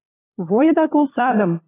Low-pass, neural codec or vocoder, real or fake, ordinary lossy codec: 3.6 kHz; codec, 16 kHz, 4 kbps, FunCodec, trained on LibriTTS, 50 frames a second; fake; AAC, 16 kbps